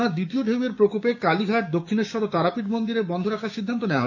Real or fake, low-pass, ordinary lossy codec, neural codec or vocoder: fake; 7.2 kHz; none; codec, 16 kHz, 6 kbps, DAC